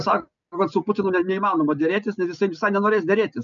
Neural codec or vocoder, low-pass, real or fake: none; 7.2 kHz; real